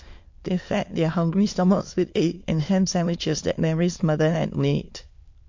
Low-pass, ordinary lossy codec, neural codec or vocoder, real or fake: 7.2 kHz; MP3, 48 kbps; autoencoder, 22.05 kHz, a latent of 192 numbers a frame, VITS, trained on many speakers; fake